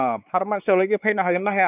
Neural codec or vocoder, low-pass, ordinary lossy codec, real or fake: codec, 16 kHz, 4 kbps, X-Codec, WavLM features, trained on Multilingual LibriSpeech; 3.6 kHz; none; fake